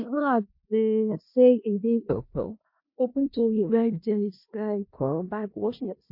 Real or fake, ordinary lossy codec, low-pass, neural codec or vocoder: fake; MP3, 32 kbps; 5.4 kHz; codec, 16 kHz in and 24 kHz out, 0.4 kbps, LongCat-Audio-Codec, four codebook decoder